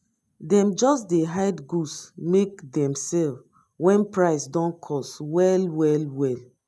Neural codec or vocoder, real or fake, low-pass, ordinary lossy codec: none; real; none; none